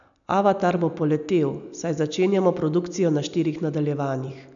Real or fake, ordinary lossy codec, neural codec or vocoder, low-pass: real; MP3, 96 kbps; none; 7.2 kHz